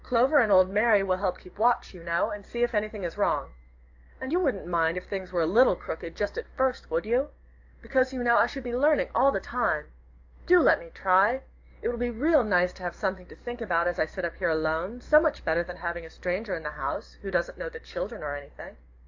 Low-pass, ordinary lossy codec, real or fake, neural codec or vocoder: 7.2 kHz; AAC, 48 kbps; fake; codec, 44.1 kHz, 7.8 kbps, DAC